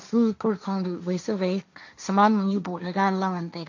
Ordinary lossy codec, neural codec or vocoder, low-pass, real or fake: none; codec, 16 kHz, 1.1 kbps, Voila-Tokenizer; 7.2 kHz; fake